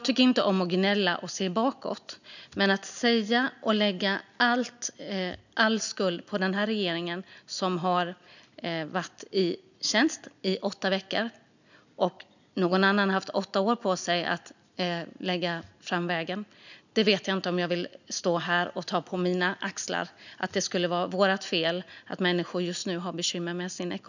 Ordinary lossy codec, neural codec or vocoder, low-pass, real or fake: none; none; 7.2 kHz; real